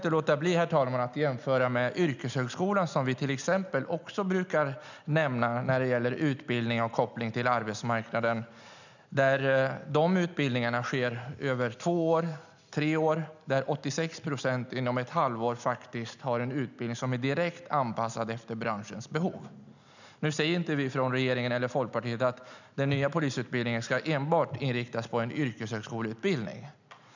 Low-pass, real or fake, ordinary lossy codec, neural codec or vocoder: 7.2 kHz; real; none; none